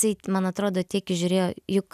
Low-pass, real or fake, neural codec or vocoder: 14.4 kHz; real; none